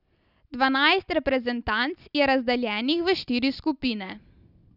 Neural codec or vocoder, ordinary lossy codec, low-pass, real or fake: none; none; 5.4 kHz; real